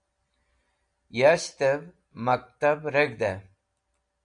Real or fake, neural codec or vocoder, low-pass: real; none; 9.9 kHz